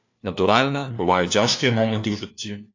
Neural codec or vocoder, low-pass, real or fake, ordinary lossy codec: codec, 16 kHz, 1 kbps, FunCodec, trained on LibriTTS, 50 frames a second; 7.2 kHz; fake; AAC, 48 kbps